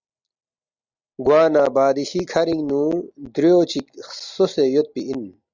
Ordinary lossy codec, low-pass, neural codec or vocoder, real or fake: Opus, 64 kbps; 7.2 kHz; none; real